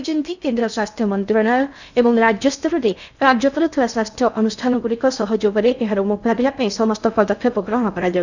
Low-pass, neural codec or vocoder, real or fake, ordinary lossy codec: 7.2 kHz; codec, 16 kHz in and 24 kHz out, 0.6 kbps, FocalCodec, streaming, 2048 codes; fake; none